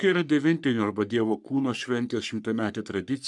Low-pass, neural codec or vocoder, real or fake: 10.8 kHz; codec, 44.1 kHz, 3.4 kbps, Pupu-Codec; fake